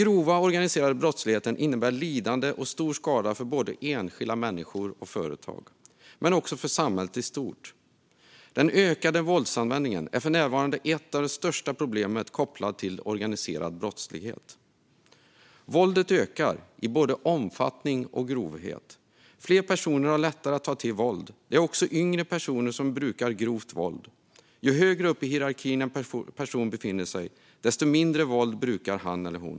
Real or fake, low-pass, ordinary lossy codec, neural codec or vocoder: real; none; none; none